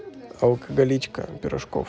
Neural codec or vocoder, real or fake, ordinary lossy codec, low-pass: none; real; none; none